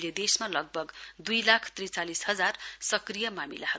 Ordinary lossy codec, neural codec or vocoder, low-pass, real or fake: none; none; none; real